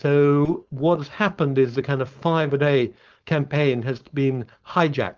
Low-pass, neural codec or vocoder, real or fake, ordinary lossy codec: 7.2 kHz; codec, 16 kHz, 4.8 kbps, FACodec; fake; Opus, 24 kbps